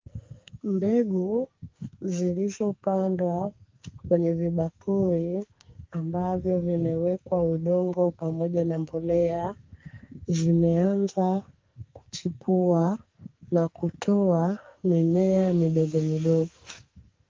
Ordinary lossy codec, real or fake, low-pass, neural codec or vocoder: Opus, 32 kbps; fake; 7.2 kHz; codec, 44.1 kHz, 2.6 kbps, SNAC